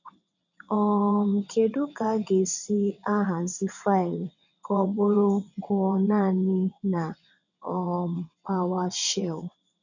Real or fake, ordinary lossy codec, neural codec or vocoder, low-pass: fake; none; vocoder, 22.05 kHz, 80 mel bands, WaveNeXt; 7.2 kHz